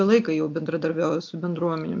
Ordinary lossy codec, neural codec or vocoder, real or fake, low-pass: AAC, 48 kbps; none; real; 7.2 kHz